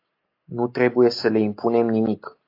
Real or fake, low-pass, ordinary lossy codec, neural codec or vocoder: real; 5.4 kHz; AAC, 32 kbps; none